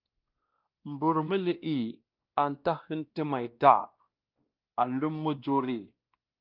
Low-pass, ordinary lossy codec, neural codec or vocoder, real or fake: 5.4 kHz; Opus, 24 kbps; codec, 16 kHz, 1 kbps, X-Codec, WavLM features, trained on Multilingual LibriSpeech; fake